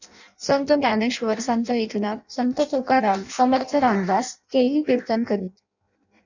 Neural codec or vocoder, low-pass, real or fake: codec, 16 kHz in and 24 kHz out, 0.6 kbps, FireRedTTS-2 codec; 7.2 kHz; fake